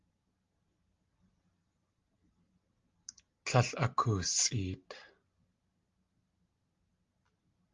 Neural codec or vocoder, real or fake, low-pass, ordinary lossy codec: none; real; 7.2 kHz; Opus, 32 kbps